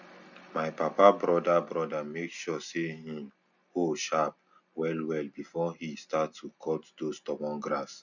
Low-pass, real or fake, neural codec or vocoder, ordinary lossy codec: 7.2 kHz; real; none; none